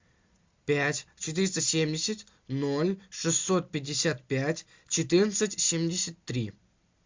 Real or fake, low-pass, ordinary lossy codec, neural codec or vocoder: real; 7.2 kHz; MP3, 64 kbps; none